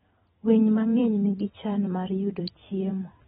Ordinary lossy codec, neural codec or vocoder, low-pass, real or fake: AAC, 16 kbps; vocoder, 44.1 kHz, 128 mel bands every 256 samples, BigVGAN v2; 19.8 kHz; fake